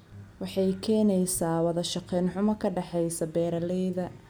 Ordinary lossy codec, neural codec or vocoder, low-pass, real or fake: none; none; none; real